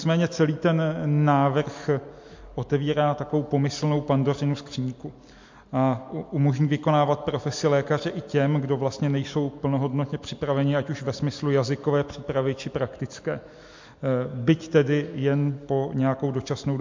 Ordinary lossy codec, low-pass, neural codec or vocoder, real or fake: MP3, 48 kbps; 7.2 kHz; none; real